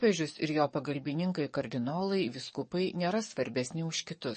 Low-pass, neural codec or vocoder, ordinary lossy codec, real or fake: 10.8 kHz; codec, 44.1 kHz, 7.8 kbps, DAC; MP3, 32 kbps; fake